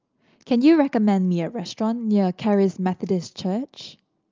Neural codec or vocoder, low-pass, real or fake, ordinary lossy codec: none; 7.2 kHz; real; Opus, 24 kbps